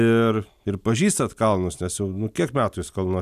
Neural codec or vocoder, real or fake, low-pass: none; real; 14.4 kHz